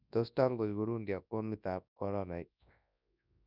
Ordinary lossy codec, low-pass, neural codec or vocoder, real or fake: none; 5.4 kHz; codec, 24 kHz, 0.9 kbps, WavTokenizer, large speech release; fake